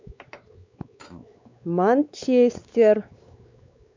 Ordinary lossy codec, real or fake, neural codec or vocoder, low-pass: MP3, 64 kbps; fake; codec, 16 kHz, 4 kbps, X-Codec, WavLM features, trained on Multilingual LibriSpeech; 7.2 kHz